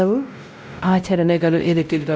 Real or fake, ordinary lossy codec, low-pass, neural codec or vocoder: fake; none; none; codec, 16 kHz, 0.5 kbps, X-Codec, WavLM features, trained on Multilingual LibriSpeech